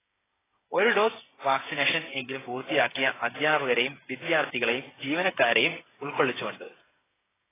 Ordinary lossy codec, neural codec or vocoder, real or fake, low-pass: AAC, 16 kbps; codec, 16 kHz, 8 kbps, FreqCodec, smaller model; fake; 3.6 kHz